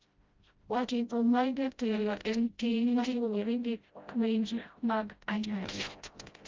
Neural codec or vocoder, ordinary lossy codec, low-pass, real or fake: codec, 16 kHz, 0.5 kbps, FreqCodec, smaller model; Opus, 24 kbps; 7.2 kHz; fake